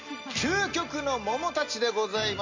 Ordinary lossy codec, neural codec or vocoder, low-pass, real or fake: MP3, 48 kbps; none; 7.2 kHz; real